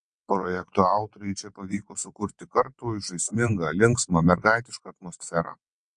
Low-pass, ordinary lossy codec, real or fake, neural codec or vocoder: 9.9 kHz; MP3, 64 kbps; fake; vocoder, 22.05 kHz, 80 mel bands, WaveNeXt